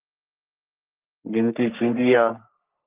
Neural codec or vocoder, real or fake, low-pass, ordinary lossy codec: codec, 44.1 kHz, 3.4 kbps, Pupu-Codec; fake; 3.6 kHz; Opus, 24 kbps